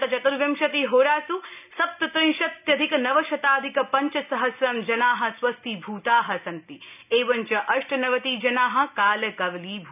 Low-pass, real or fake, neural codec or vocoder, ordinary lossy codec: 3.6 kHz; real; none; none